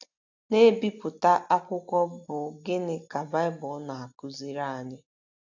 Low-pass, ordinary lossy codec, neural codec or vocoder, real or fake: 7.2 kHz; AAC, 48 kbps; none; real